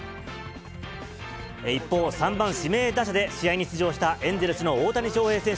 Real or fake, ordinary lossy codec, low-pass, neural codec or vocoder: real; none; none; none